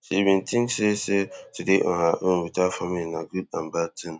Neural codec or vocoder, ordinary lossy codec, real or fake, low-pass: none; none; real; none